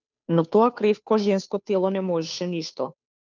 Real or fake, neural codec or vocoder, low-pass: fake; codec, 16 kHz, 2 kbps, FunCodec, trained on Chinese and English, 25 frames a second; 7.2 kHz